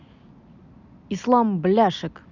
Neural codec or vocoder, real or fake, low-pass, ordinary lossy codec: none; real; 7.2 kHz; none